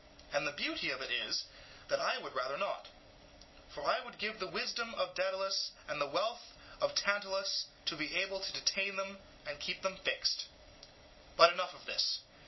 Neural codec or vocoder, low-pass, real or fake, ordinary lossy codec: none; 7.2 kHz; real; MP3, 24 kbps